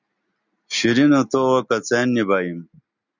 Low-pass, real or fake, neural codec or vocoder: 7.2 kHz; real; none